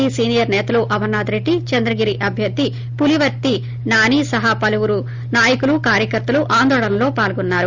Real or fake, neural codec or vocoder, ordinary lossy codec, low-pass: real; none; Opus, 32 kbps; 7.2 kHz